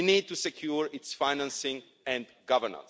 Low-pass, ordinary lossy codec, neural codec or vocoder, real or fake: none; none; none; real